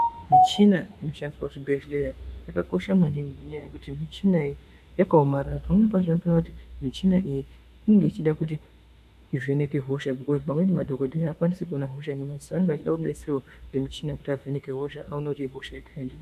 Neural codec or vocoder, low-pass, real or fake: autoencoder, 48 kHz, 32 numbers a frame, DAC-VAE, trained on Japanese speech; 14.4 kHz; fake